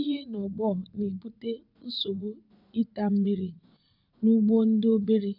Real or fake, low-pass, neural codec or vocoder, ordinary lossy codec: fake; 5.4 kHz; vocoder, 44.1 kHz, 80 mel bands, Vocos; MP3, 48 kbps